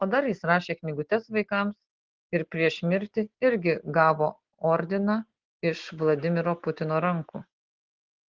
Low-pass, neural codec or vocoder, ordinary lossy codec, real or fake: 7.2 kHz; none; Opus, 16 kbps; real